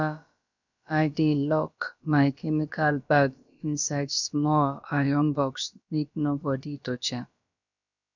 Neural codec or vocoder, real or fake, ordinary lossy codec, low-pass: codec, 16 kHz, about 1 kbps, DyCAST, with the encoder's durations; fake; none; 7.2 kHz